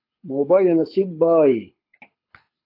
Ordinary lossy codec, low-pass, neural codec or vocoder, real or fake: AAC, 32 kbps; 5.4 kHz; codec, 24 kHz, 6 kbps, HILCodec; fake